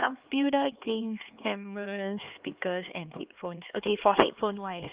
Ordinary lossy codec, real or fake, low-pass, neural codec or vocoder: Opus, 32 kbps; fake; 3.6 kHz; codec, 16 kHz, 2 kbps, X-Codec, HuBERT features, trained on LibriSpeech